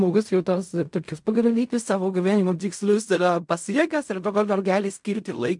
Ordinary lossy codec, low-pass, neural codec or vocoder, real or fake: MP3, 64 kbps; 10.8 kHz; codec, 16 kHz in and 24 kHz out, 0.4 kbps, LongCat-Audio-Codec, fine tuned four codebook decoder; fake